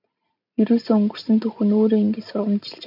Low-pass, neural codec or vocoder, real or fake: 5.4 kHz; none; real